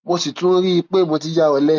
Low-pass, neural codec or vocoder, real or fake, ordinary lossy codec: none; none; real; none